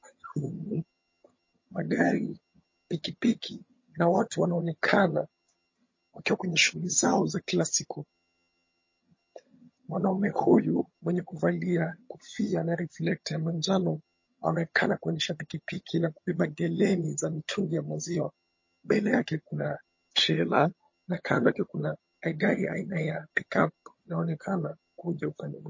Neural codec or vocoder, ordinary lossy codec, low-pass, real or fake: vocoder, 22.05 kHz, 80 mel bands, HiFi-GAN; MP3, 32 kbps; 7.2 kHz; fake